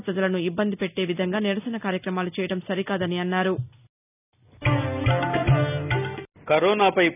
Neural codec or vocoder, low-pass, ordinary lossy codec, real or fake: none; 3.6 kHz; none; real